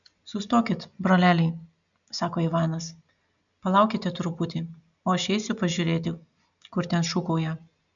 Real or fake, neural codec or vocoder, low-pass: real; none; 7.2 kHz